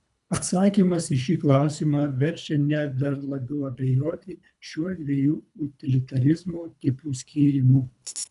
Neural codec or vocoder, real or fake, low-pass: codec, 24 kHz, 3 kbps, HILCodec; fake; 10.8 kHz